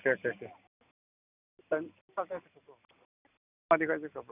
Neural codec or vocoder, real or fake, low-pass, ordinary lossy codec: none; real; 3.6 kHz; none